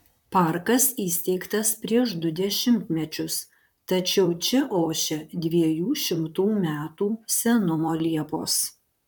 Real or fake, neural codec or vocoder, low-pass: fake; vocoder, 44.1 kHz, 128 mel bands, Pupu-Vocoder; 19.8 kHz